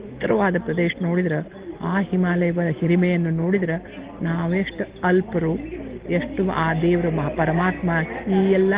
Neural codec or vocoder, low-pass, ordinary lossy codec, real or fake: none; 3.6 kHz; Opus, 16 kbps; real